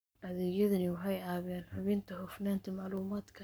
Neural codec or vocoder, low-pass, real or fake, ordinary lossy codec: codec, 44.1 kHz, 7.8 kbps, Pupu-Codec; none; fake; none